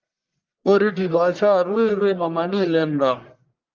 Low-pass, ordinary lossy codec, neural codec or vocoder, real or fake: 7.2 kHz; Opus, 32 kbps; codec, 44.1 kHz, 1.7 kbps, Pupu-Codec; fake